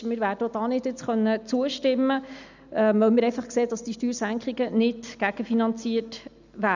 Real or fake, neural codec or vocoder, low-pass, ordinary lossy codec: real; none; 7.2 kHz; none